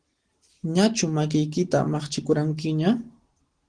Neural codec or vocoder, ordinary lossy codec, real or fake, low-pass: none; Opus, 16 kbps; real; 9.9 kHz